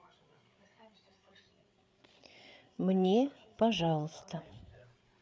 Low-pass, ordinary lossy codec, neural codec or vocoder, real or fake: none; none; codec, 16 kHz, 8 kbps, FreqCodec, larger model; fake